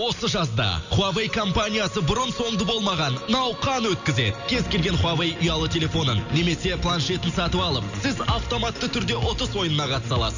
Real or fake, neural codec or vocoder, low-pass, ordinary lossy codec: real; none; 7.2 kHz; none